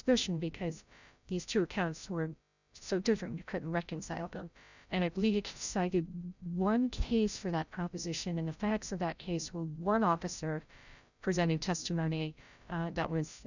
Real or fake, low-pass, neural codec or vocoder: fake; 7.2 kHz; codec, 16 kHz, 0.5 kbps, FreqCodec, larger model